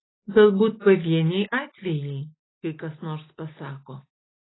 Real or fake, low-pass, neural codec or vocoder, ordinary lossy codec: real; 7.2 kHz; none; AAC, 16 kbps